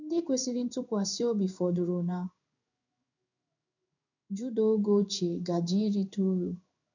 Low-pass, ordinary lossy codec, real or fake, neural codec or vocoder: 7.2 kHz; none; fake; codec, 16 kHz in and 24 kHz out, 1 kbps, XY-Tokenizer